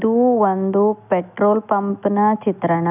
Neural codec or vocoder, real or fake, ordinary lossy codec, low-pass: none; real; none; 3.6 kHz